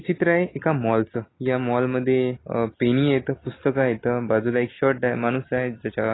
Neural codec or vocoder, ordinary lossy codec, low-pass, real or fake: none; AAC, 16 kbps; 7.2 kHz; real